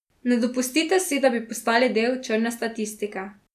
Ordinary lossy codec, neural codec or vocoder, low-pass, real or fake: none; none; 14.4 kHz; real